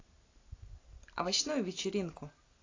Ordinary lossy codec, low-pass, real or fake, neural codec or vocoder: AAC, 32 kbps; 7.2 kHz; real; none